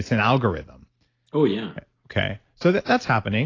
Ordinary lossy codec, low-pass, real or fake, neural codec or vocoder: AAC, 32 kbps; 7.2 kHz; real; none